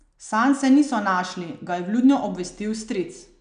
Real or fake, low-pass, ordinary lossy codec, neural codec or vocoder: real; 9.9 kHz; none; none